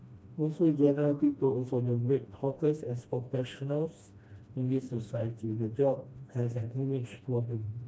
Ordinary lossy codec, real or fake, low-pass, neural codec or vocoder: none; fake; none; codec, 16 kHz, 1 kbps, FreqCodec, smaller model